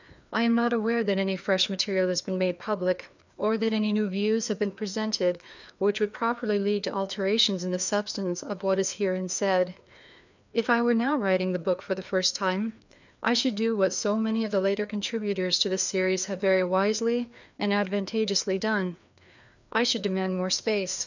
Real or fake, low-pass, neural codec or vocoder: fake; 7.2 kHz; codec, 16 kHz, 2 kbps, FreqCodec, larger model